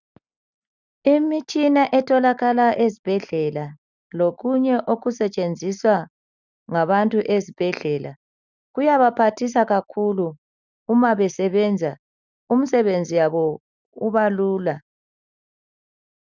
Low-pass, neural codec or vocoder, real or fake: 7.2 kHz; none; real